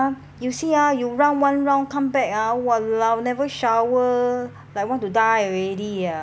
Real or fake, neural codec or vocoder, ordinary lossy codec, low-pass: real; none; none; none